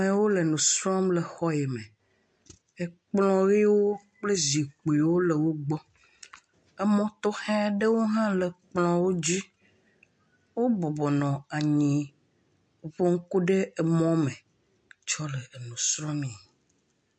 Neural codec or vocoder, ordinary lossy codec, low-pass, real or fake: none; MP3, 48 kbps; 9.9 kHz; real